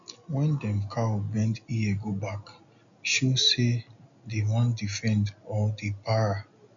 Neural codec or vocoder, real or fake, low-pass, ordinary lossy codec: none; real; 7.2 kHz; none